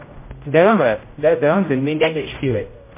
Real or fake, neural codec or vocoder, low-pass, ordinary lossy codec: fake; codec, 16 kHz, 0.5 kbps, X-Codec, HuBERT features, trained on general audio; 3.6 kHz; MP3, 24 kbps